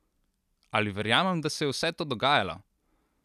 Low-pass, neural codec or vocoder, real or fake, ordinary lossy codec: 14.4 kHz; none; real; none